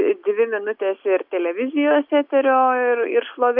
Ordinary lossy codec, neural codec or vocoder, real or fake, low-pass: AAC, 48 kbps; none; real; 5.4 kHz